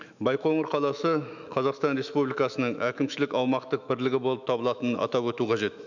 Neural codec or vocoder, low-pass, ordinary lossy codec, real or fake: autoencoder, 48 kHz, 128 numbers a frame, DAC-VAE, trained on Japanese speech; 7.2 kHz; none; fake